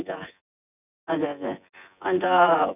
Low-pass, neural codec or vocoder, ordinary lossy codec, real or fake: 3.6 kHz; vocoder, 24 kHz, 100 mel bands, Vocos; none; fake